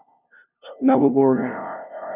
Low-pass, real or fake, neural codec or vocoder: 3.6 kHz; fake; codec, 16 kHz, 0.5 kbps, FunCodec, trained on LibriTTS, 25 frames a second